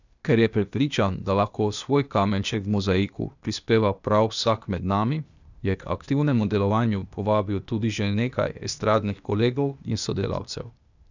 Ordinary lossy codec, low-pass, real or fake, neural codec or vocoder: none; 7.2 kHz; fake; codec, 16 kHz, 0.8 kbps, ZipCodec